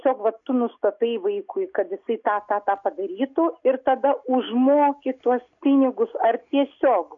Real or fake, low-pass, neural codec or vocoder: real; 7.2 kHz; none